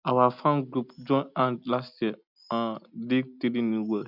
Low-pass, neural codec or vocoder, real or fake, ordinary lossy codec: 5.4 kHz; none; real; none